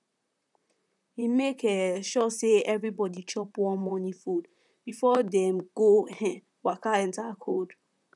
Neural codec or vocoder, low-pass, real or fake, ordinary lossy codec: vocoder, 44.1 kHz, 128 mel bands, Pupu-Vocoder; 10.8 kHz; fake; none